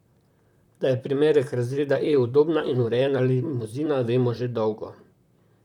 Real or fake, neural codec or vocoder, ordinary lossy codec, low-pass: fake; vocoder, 44.1 kHz, 128 mel bands, Pupu-Vocoder; none; 19.8 kHz